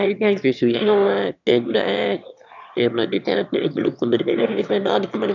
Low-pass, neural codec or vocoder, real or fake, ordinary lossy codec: 7.2 kHz; autoencoder, 22.05 kHz, a latent of 192 numbers a frame, VITS, trained on one speaker; fake; none